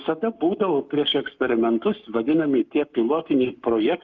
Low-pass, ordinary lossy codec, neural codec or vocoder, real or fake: 7.2 kHz; Opus, 24 kbps; codec, 44.1 kHz, 7.8 kbps, Pupu-Codec; fake